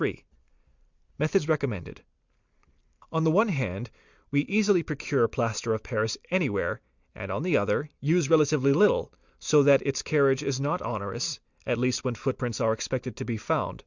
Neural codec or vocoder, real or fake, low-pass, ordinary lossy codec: none; real; 7.2 kHz; Opus, 64 kbps